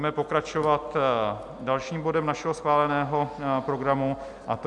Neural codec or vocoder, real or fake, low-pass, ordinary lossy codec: none; real; 10.8 kHz; MP3, 96 kbps